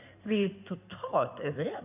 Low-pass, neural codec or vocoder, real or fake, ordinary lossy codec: 3.6 kHz; codec, 16 kHz in and 24 kHz out, 2.2 kbps, FireRedTTS-2 codec; fake; none